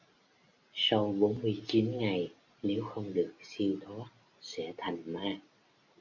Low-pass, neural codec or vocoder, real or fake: 7.2 kHz; none; real